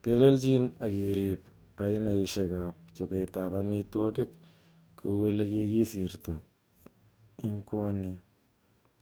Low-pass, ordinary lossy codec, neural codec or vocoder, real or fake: none; none; codec, 44.1 kHz, 2.6 kbps, DAC; fake